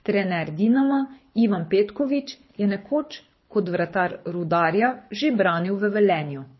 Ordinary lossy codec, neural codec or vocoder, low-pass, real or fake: MP3, 24 kbps; codec, 24 kHz, 6 kbps, HILCodec; 7.2 kHz; fake